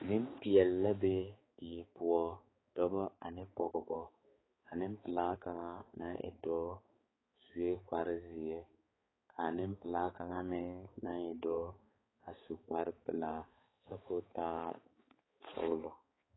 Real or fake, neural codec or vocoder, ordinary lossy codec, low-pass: fake; codec, 16 kHz, 4 kbps, X-Codec, HuBERT features, trained on balanced general audio; AAC, 16 kbps; 7.2 kHz